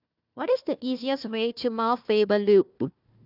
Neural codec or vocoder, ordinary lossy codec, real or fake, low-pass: codec, 16 kHz, 1 kbps, FunCodec, trained on Chinese and English, 50 frames a second; none; fake; 5.4 kHz